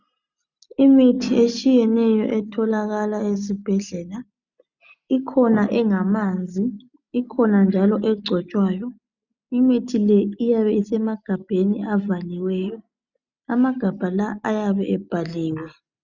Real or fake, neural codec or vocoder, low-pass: real; none; 7.2 kHz